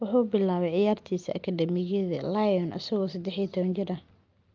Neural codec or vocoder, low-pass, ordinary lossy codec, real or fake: none; 7.2 kHz; Opus, 24 kbps; real